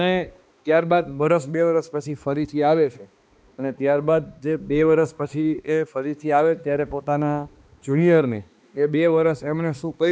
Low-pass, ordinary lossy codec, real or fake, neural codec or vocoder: none; none; fake; codec, 16 kHz, 2 kbps, X-Codec, HuBERT features, trained on balanced general audio